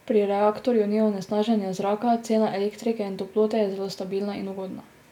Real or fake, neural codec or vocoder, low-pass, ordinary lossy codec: real; none; 19.8 kHz; none